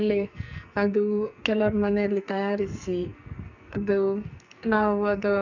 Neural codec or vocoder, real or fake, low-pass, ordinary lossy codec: codec, 44.1 kHz, 2.6 kbps, SNAC; fake; 7.2 kHz; none